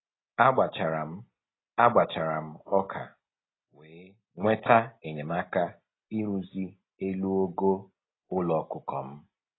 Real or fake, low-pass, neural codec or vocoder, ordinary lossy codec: real; 7.2 kHz; none; AAC, 16 kbps